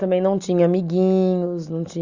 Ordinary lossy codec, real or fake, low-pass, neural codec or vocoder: Opus, 64 kbps; real; 7.2 kHz; none